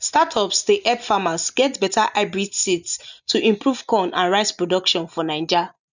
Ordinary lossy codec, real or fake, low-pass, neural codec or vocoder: none; real; 7.2 kHz; none